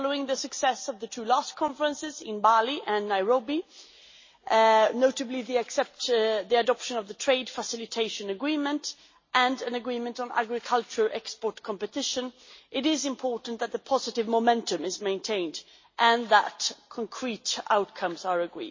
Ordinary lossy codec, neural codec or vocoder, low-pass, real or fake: MP3, 32 kbps; none; 7.2 kHz; real